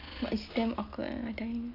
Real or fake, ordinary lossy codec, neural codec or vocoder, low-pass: real; AAC, 32 kbps; none; 5.4 kHz